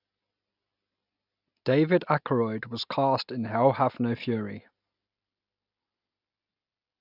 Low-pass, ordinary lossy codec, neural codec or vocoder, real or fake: 5.4 kHz; none; none; real